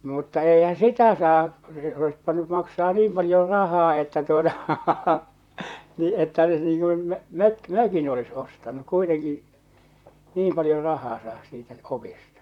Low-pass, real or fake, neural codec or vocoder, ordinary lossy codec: 19.8 kHz; fake; vocoder, 44.1 kHz, 128 mel bands, Pupu-Vocoder; none